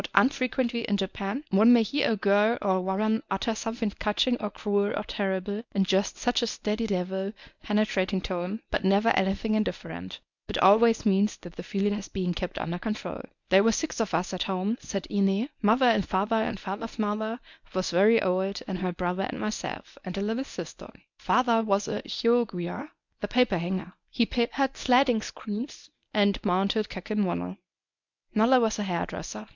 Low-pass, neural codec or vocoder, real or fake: 7.2 kHz; codec, 24 kHz, 0.9 kbps, WavTokenizer, medium speech release version 1; fake